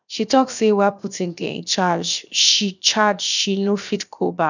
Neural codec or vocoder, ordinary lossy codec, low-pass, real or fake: codec, 16 kHz, 0.7 kbps, FocalCodec; none; 7.2 kHz; fake